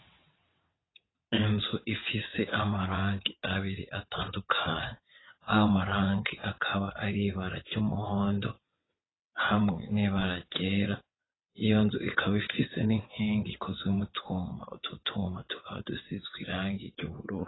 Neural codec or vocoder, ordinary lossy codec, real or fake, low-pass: vocoder, 22.05 kHz, 80 mel bands, WaveNeXt; AAC, 16 kbps; fake; 7.2 kHz